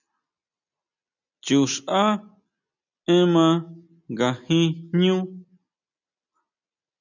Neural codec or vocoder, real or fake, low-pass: none; real; 7.2 kHz